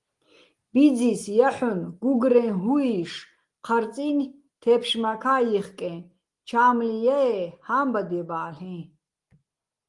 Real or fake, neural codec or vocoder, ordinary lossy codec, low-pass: real; none; Opus, 24 kbps; 10.8 kHz